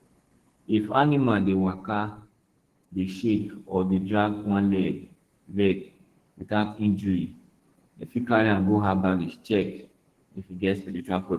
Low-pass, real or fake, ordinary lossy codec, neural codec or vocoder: 14.4 kHz; fake; Opus, 16 kbps; codec, 44.1 kHz, 2.6 kbps, SNAC